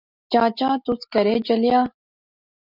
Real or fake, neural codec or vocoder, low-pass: real; none; 5.4 kHz